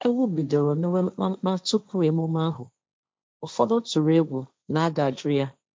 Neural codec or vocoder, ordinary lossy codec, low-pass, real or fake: codec, 16 kHz, 1.1 kbps, Voila-Tokenizer; none; none; fake